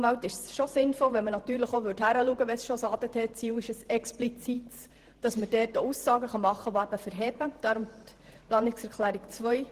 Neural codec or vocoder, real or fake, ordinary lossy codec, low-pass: vocoder, 48 kHz, 128 mel bands, Vocos; fake; Opus, 16 kbps; 14.4 kHz